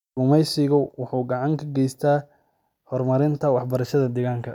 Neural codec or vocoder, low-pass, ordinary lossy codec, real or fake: none; 19.8 kHz; none; real